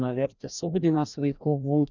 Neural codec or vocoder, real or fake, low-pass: codec, 16 kHz, 1 kbps, FreqCodec, larger model; fake; 7.2 kHz